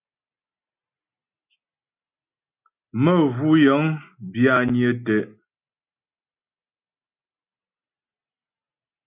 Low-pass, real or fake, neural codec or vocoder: 3.6 kHz; real; none